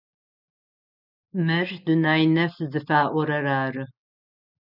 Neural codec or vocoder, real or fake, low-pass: none; real; 5.4 kHz